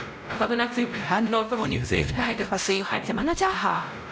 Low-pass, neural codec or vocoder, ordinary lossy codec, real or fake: none; codec, 16 kHz, 0.5 kbps, X-Codec, WavLM features, trained on Multilingual LibriSpeech; none; fake